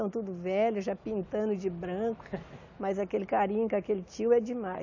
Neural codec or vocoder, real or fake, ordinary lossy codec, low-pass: none; real; AAC, 48 kbps; 7.2 kHz